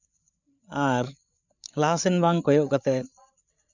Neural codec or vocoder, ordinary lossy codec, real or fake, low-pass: codec, 16 kHz, 8 kbps, FreqCodec, larger model; none; fake; 7.2 kHz